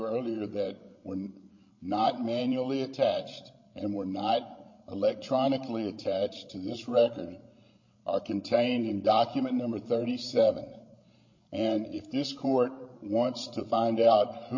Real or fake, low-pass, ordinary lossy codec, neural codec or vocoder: fake; 7.2 kHz; MP3, 32 kbps; codec, 16 kHz, 16 kbps, FreqCodec, larger model